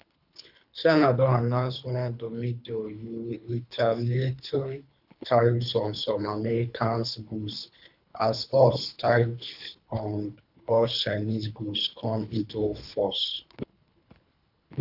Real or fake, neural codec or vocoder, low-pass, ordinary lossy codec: fake; codec, 24 kHz, 3 kbps, HILCodec; 5.4 kHz; none